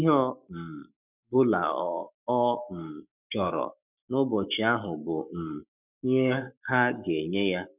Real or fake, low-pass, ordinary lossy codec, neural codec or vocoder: fake; 3.6 kHz; none; codec, 44.1 kHz, 7.8 kbps, Pupu-Codec